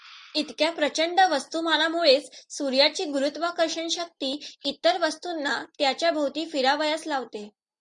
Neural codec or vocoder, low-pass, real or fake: none; 10.8 kHz; real